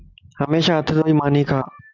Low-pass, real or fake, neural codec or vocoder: 7.2 kHz; real; none